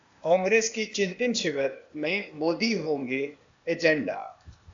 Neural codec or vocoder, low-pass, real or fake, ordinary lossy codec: codec, 16 kHz, 0.8 kbps, ZipCodec; 7.2 kHz; fake; AAC, 64 kbps